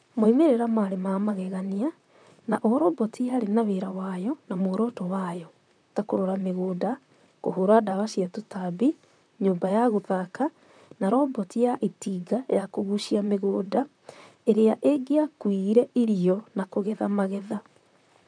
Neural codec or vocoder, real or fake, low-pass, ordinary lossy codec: vocoder, 44.1 kHz, 128 mel bands, Pupu-Vocoder; fake; 9.9 kHz; none